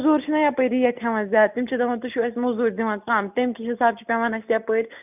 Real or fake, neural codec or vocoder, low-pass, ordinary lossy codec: real; none; 3.6 kHz; none